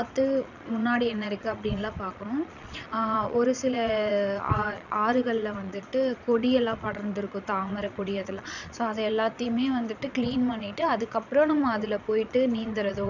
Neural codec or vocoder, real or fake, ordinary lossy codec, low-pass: vocoder, 22.05 kHz, 80 mel bands, Vocos; fake; none; 7.2 kHz